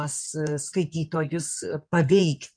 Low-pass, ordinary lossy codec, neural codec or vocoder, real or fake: 9.9 kHz; MP3, 96 kbps; codec, 16 kHz in and 24 kHz out, 2.2 kbps, FireRedTTS-2 codec; fake